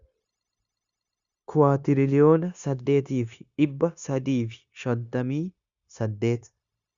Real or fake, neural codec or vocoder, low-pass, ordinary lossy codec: fake; codec, 16 kHz, 0.9 kbps, LongCat-Audio-Codec; 7.2 kHz; Opus, 64 kbps